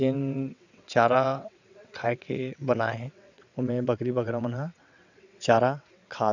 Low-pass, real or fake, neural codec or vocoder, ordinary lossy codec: 7.2 kHz; fake; vocoder, 22.05 kHz, 80 mel bands, WaveNeXt; none